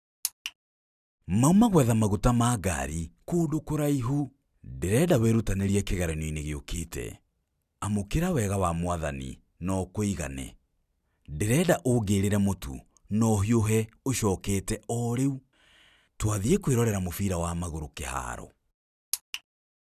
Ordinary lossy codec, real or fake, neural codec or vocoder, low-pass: none; real; none; 14.4 kHz